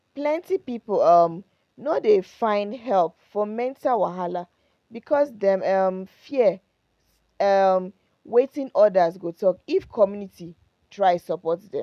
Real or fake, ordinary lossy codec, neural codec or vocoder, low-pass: real; none; none; 14.4 kHz